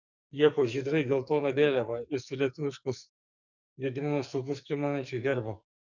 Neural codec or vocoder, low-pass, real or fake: codec, 44.1 kHz, 2.6 kbps, SNAC; 7.2 kHz; fake